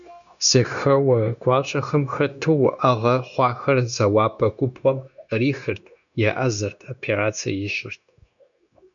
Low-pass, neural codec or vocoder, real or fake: 7.2 kHz; codec, 16 kHz, 0.9 kbps, LongCat-Audio-Codec; fake